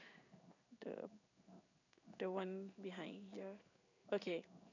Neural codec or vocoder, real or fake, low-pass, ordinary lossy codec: codec, 16 kHz in and 24 kHz out, 1 kbps, XY-Tokenizer; fake; 7.2 kHz; none